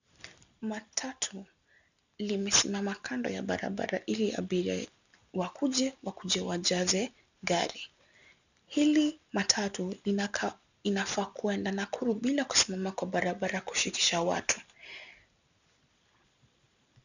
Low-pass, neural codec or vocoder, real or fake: 7.2 kHz; none; real